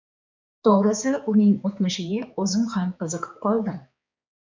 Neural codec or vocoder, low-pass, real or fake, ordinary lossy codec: codec, 16 kHz, 4 kbps, X-Codec, HuBERT features, trained on balanced general audio; 7.2 kHz; fake; MP3, 64 kbps